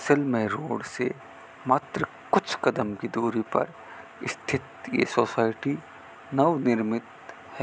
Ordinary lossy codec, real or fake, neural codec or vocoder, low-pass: none; real; none; none